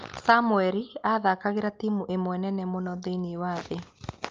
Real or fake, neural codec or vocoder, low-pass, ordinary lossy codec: real; none; 7.2 kHz; Opus, 24 kbps